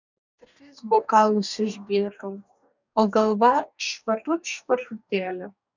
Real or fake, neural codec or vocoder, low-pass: fake; codec, 44.1 kHz, 2.6 kbps, DAC; 7.2 kHz